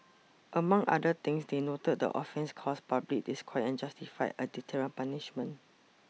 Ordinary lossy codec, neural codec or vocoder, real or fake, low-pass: none; none; real; none